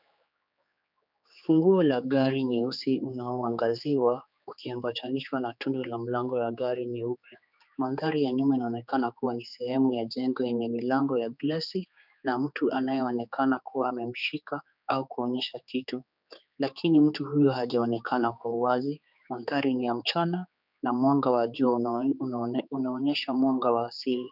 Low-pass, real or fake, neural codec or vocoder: 5.4 kHz; fake; codec, 16 kHz, 4 kbps, X-Codec, HuBERT features, trained on general audio